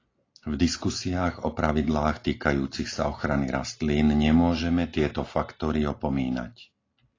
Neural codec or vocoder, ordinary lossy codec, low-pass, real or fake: none; AAC, 32 kbps; 7.2 kHz; real